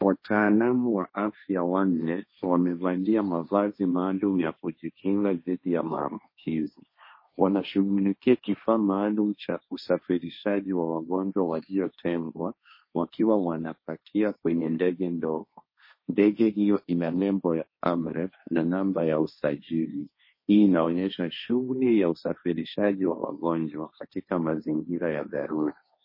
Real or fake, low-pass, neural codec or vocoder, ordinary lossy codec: fake; 5.4 kHz; codec, 16 kHz, 1.1 kbps, Voila-Tokenizer; MP3, 24 kbps